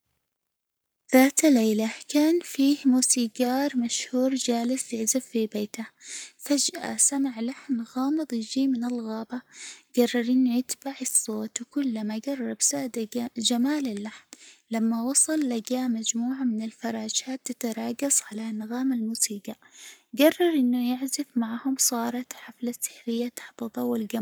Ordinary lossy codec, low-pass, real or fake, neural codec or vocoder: none; none; fake; codec, 44.1 kHz, 7.8 kbps, Pupu-Codec